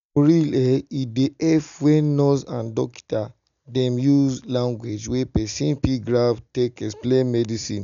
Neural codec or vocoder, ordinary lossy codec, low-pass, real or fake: none; none; 7.2 kHz; real